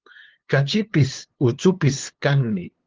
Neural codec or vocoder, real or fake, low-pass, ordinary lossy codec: codec, 16 kHz, 2 kbps, FunCodec, trained on LibriTTS, 25 frames a second; fake; 7.2 kHz; Opus, 16 kbps